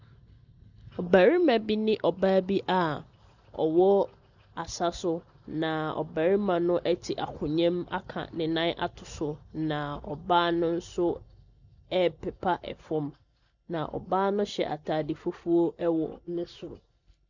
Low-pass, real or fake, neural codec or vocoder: 7.2 kHz; real; none